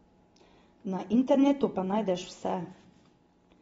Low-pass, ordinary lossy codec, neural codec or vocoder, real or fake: 14.4 kHz; AAC, 24 kbps; none; real